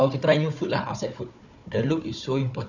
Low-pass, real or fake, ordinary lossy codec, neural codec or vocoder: 7.2 kHz; fake; none; codec, 16 kHz, 4 kbps, FunCodec, trained on Chinese and English, 50 frames a second